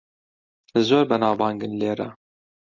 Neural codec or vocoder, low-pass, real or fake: none; 7.2 kHz; real